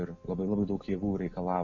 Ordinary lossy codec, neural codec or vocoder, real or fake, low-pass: MP3, 48 kbps; none; real; 7.2 kHz